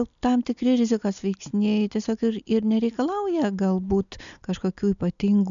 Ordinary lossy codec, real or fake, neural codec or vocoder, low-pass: MP3, 96 kbps; real; none; 7.2 kHz